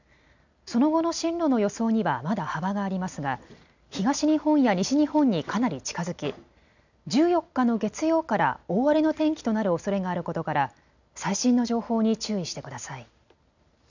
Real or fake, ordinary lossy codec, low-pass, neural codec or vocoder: real; none; 7.2 kHz; none